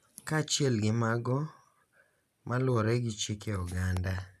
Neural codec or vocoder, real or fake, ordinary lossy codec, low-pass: none; real; none; 14.4 kHz